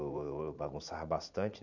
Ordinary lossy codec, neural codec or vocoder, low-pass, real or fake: none; vocoder, 44.1 kHz, 80 mel bands, Vocos; 7.2 kHz; fake